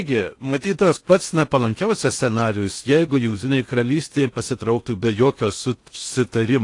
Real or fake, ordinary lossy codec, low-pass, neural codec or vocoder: fake; AAC, 48 kbps; 10.8 kHz; codec, 16 kHz in and 24 kHz out, 0.6 kbps, FocalCodec, streaming, 4096 codes